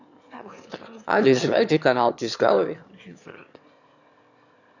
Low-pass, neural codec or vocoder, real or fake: 7.2 kHz; autoencoder, 22.05 kHz, a latent of 192 numbers a frame, VITS, trained on one speaker; fake